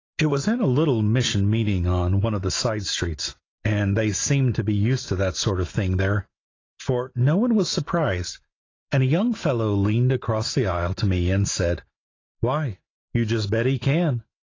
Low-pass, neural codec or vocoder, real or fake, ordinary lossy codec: 7.2 kHz; none; real; AAC, 32 kbps